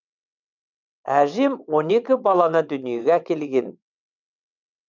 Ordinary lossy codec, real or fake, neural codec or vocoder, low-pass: none; fake; vocoder, 22.05 kHz, 80 mel bands, WaveNeXt; 7.2 kHz